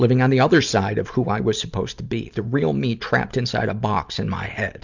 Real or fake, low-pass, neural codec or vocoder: real; 7.2 kHz; none